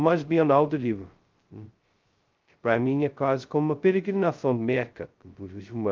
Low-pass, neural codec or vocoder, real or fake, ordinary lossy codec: 7.2 kHz; codec, 16 kHz, 0.2 kbps, FocalCodec; fake; Opus, 32 kbps